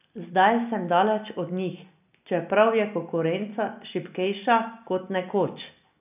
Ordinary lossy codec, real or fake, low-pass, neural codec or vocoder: none; real; 3.6 kHz; none